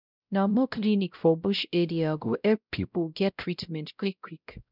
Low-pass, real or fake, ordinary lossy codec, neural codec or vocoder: 5.4 kHz; fake; none; codec, 16 kHz, 0.5 kbps, X-Codec, WavLM features, trained on Multilingual LibriSpeech